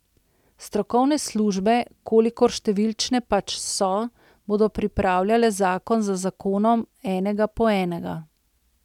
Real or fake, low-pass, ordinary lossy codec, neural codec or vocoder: real; 19.8 kHz; none; none